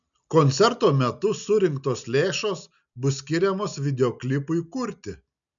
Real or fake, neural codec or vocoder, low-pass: real; none; 7.2 kHz